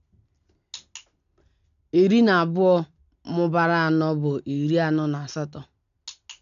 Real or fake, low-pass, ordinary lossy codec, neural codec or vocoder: real; 7.2 kHz; none; none